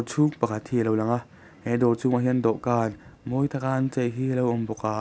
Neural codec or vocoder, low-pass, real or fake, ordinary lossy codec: none; none; real; none